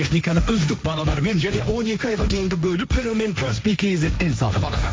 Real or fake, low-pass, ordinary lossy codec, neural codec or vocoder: fake; none; none; codec, 16 kHz, 1.1 kbps, Voila-Tokenizer